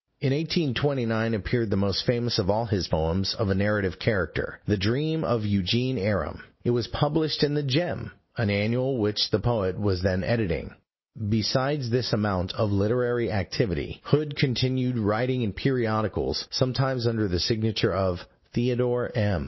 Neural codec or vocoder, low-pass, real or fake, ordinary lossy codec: none; 7.2 kHz; real; MP3, 24 kbps